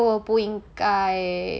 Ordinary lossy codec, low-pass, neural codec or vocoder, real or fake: none; none; none; real